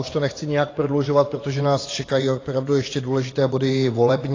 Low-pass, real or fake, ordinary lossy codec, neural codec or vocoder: 7.2 kHz; fake; AAC, 32 kbps; vocoder, 24 kHz, 100 mel bands, Vocos